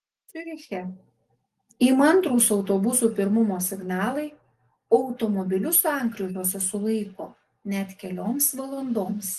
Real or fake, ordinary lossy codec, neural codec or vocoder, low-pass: real; Opus, 24 kbps; none; 14.4 kHz